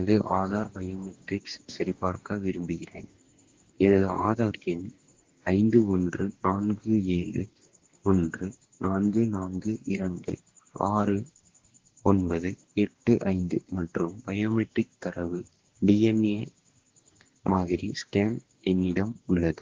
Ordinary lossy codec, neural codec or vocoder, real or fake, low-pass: Opus, 16 kbps; codec, 44.1 kHz, 2.6 kbps, DAC; fake; 7.2 kHz